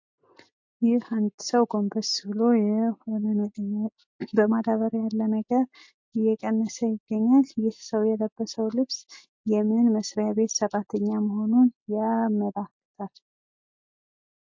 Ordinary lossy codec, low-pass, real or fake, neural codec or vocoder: MP3, 48 kbps; 7.2 kHz; real; none